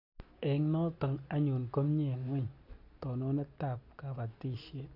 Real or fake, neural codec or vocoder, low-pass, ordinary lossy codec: real; none; 5.4 kHz; AAC, 32 kbps